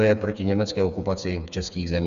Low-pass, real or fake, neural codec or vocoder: 7.2 kHz; fake; codec, 16 kHz, 4 kbps, FreqCodec, smaller model